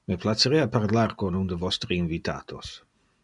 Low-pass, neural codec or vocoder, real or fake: 10.8 kHz; none; real